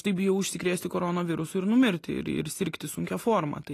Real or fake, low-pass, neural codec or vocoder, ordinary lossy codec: real; 14.4 kHz; none; AAC, 48 kbps